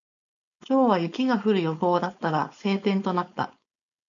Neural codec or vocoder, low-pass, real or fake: codec, 16 kHz, 4.8 kbps, FACodec; 7.2 kHz; fake